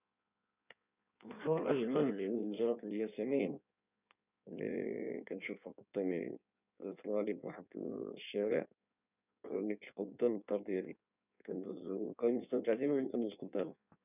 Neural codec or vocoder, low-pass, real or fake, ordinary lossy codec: codec, 16 kHz in and 24 kHz out, 1.1 kbps, FireRedTTS-2 codec; 3.6 kHz; fake; none